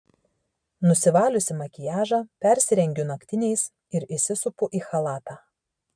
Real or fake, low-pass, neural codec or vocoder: real; 9.9 kHz; none